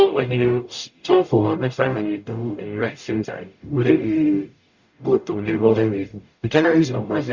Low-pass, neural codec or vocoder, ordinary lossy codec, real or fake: 7.2 kHz; codec, 44.1 kHz, 0.9 kbps, DAC; Opus, 64 kbps; fake